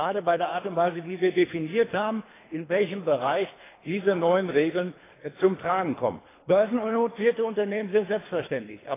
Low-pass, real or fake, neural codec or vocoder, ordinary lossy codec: 3.6 kHz; fake; codec, 24 kHz, 3 kbps, HILCodec; AAC, 16 kbps